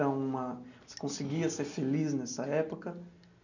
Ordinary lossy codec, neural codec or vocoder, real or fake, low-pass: none; none; real; 7.2 kHz